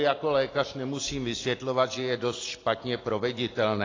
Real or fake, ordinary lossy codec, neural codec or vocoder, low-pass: real; AAC, 32 kbps; none; 7.2 kHz